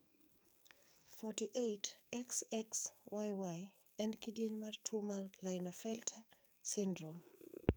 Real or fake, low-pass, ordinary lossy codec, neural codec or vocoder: fake; none; none; codec, 44.1 kHz, 2.6 kbps, SNAC